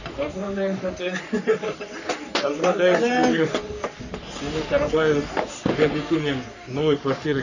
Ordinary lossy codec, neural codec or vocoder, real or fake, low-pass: none; codec, 44.1 kHz, 3.4 kbps, Pupu-Codec; fake; 7.2 kHz